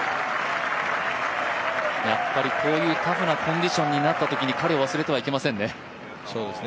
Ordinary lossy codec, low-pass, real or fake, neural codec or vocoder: none; none; real; none